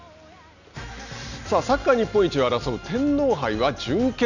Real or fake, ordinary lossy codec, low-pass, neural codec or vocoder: real; none; 7.2 kHz; none